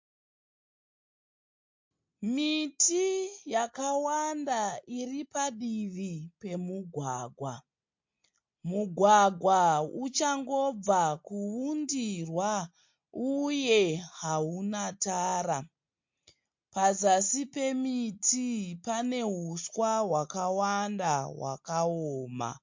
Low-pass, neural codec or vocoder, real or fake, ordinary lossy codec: 7.2 kHz; none; real; MP3, 48 kbps